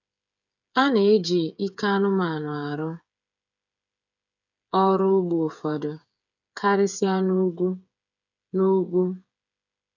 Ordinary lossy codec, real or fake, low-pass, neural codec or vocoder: none; fake; 7.2 kHz; codec, 16 kHz, 8 kbps, FreqCodec, smaller model